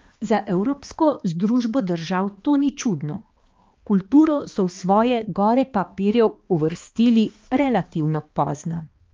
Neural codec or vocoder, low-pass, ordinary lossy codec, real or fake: codec, 16 kHz, 2 kbps, X-Codec, HuBERT features, trained on balanced general audio; 7.2 kHz; Opus, 32 kbps; fake